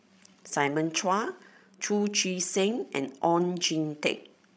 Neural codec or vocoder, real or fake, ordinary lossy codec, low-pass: codec, 16 kHz, 16 kbps, FreqCodec, larger model; fake; none; none